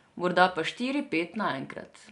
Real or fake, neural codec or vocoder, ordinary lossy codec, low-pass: real; none; none; 10.8 kHz